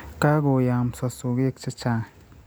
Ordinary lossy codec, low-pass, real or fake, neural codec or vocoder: none; none; real; none